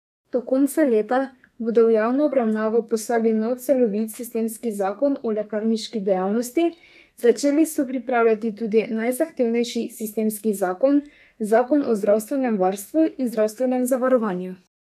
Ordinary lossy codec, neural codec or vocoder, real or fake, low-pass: none; codec, 32 kHz, 1.9 kbps, SNAC; fake; 14.4 kHz